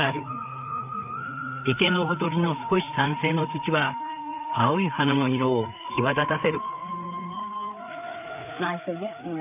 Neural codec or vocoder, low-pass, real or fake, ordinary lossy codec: codec, 16 kHz, 4 kbps, FreqCodec, larger model; 3.6 kHz; fake; none